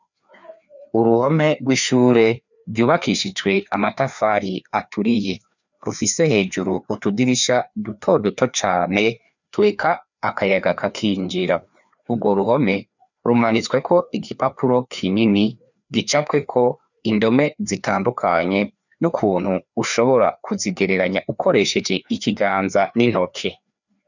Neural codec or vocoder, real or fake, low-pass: codec, 16 kHz, 2 kbps, FreqCodec, larger model; fake; 7.2 kHz